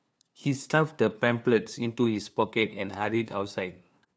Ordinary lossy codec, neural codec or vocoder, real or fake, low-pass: none; codec, 16 kHz, 2 kbps, FunCodec, trained on LibriTTS, 25 frames a second; fake; none